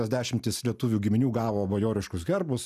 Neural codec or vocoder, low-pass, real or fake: none; 14.4 kHz; real